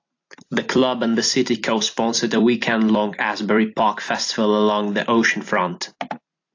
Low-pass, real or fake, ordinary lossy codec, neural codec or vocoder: 7.2 kHz; fake; AAC, 48 kbps; vocoder, 44.1 kHz, 128 mel bands every 256 samples, BigVGAN v2